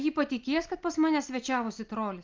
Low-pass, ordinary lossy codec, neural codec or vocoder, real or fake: 7.2 kHz; Opus, 32 kbps; none; real